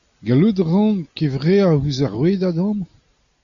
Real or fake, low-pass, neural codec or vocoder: real; 7.2 kHz; none